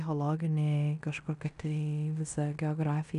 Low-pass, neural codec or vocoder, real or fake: 10.8 kHz; codec, 16 kHz in and 24 kHz out, 0.9 kbps, LongCat-Audio-Codec, fine tuned four codebook decoder; fake